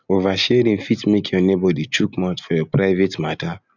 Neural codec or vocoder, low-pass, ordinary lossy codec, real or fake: none; 7.2 kHz; none; real